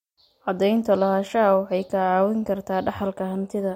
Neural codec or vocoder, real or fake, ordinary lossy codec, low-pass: none; real; MP3, 64 kbps; 19.8 kHz